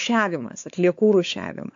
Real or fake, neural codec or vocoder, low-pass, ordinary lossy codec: fake; codec, 16 kHz, 4 kbps, X-Codec, WavLM features, trained on Multilingual LibriSpeech; 7.2 kHz; AAC, 64 kbps